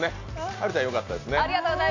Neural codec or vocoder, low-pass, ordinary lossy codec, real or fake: none; 7.2 kHz; AAC, 48 kbps; real